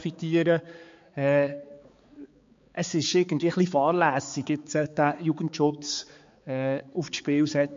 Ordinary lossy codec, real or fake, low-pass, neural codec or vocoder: MP3, 48 kbps; fake; 7.2 kHz; codec, 16 kHz, 4 kbps, X-Codec, HuBERT features, trained on balanced general audio